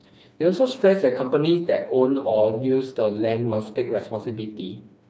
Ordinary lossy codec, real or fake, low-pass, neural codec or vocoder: none; fake; none; codec, 16 kHz, 2 kbps, FreqCodec, smaller model